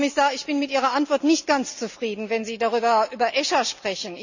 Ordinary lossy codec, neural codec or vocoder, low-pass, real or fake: none; none; 7.2 kHz; real